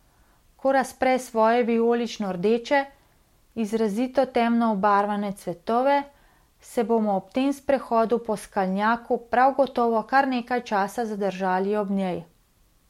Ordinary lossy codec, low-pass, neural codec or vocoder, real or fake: MP3, 64 kbps; 19.8 kHz; none; real